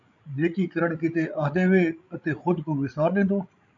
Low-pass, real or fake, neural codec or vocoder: 7.2 kHz; fake; codec, 16 kHz, 16 kbps, FreqCodec, larger model